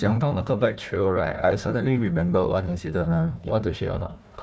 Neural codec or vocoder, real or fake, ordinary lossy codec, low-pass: codec, 16 kHz, 1 kbps, FunCodec, trained on Chinese and English, 50 frames a second; fake; none; none